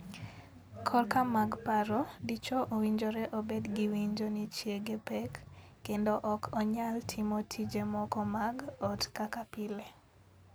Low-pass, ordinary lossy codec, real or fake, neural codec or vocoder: none; none; real; none